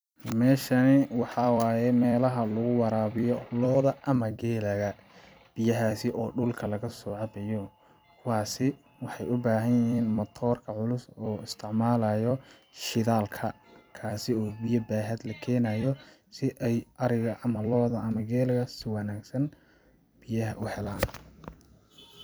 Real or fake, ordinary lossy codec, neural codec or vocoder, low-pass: fake; none; vocoder, 44.1 kHz, 128 mel bands every 256 samples, BigVGAN v2; none